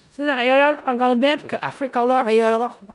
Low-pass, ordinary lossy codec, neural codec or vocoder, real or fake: 10.8 kHz; none; codec, 16 kHz in and 24 kHz out, 0.4 kbps, LongCat-Audio-Codec, four codebook decoder; fake